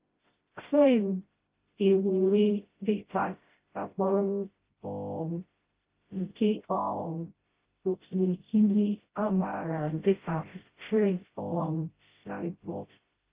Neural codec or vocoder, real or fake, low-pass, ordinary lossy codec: codec, 16 kHz, 0.5 kbps, FreqCodec, smaller model; fake; 3.6 kHz; Opus, 32 kbps